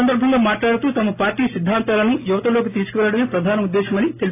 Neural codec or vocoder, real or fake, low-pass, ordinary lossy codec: none; real; 3.6 kHz; AAC, 24 kbps